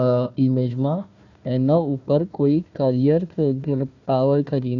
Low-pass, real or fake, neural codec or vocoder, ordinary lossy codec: 7.2 kHz; fake; codec, 16 kHz, 1 kbps, FunCodec, trained on Chinese and English, 50 frames a second; none